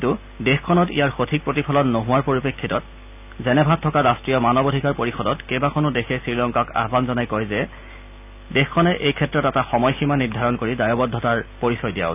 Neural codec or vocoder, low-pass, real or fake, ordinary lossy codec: none; 3.6 kHz; real; none